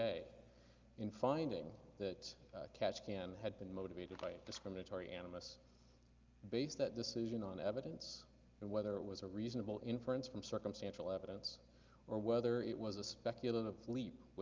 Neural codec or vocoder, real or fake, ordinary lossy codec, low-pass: none; real; Opus, 32 kbps; 7.2 kHz